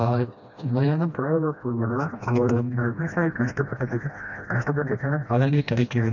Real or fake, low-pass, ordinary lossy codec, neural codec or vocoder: fake; 7.2 kHz; none; codec, 16 kHz, 1 kbps, FreqCodec, smaller model